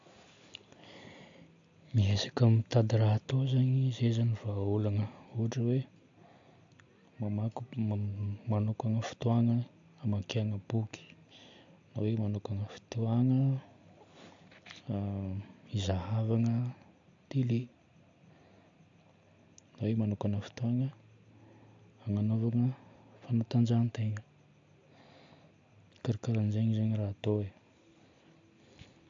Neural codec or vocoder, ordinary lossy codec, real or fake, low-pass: none; AAC, 64 kbps; real; 7.2 kHz